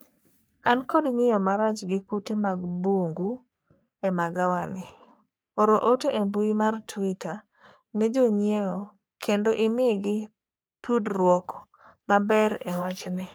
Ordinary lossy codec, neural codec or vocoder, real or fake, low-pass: none; codec, 44.1 kHz, 3.4 kbps, Pupu-Codec; fake; none